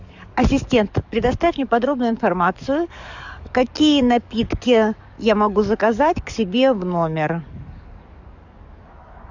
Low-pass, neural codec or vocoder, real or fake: 7.2 kHz; codec, 44.1 kHz, 7.8 kbps, DAC; fake